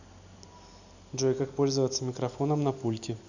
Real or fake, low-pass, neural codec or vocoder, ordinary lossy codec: real; 7.2 kHz; none; none